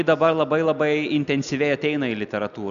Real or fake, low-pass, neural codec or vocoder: real; 7.2 kHz; none